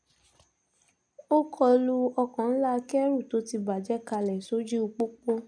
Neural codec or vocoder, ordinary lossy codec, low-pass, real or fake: none; none; 9.9 kHz; real